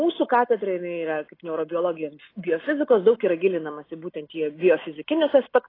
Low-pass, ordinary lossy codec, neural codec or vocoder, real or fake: 5.4 kHz; AAC, 24 kbps; none; real